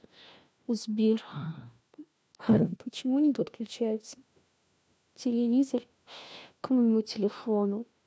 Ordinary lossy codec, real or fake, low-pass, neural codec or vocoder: none; fake; none; codec, 16 kHz, 1 kbps, FunCodec, trained on LibriTTS, 50 frames a second